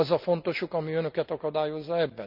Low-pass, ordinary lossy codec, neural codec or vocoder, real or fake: 5.4 kHz; none; none; real